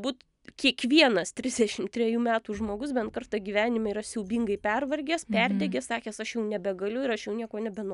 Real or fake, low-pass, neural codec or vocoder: real; 10.8 kHz; none